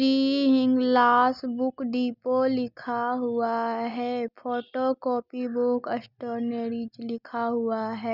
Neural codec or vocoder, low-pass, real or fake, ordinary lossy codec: none; 5.4 kHz; real; none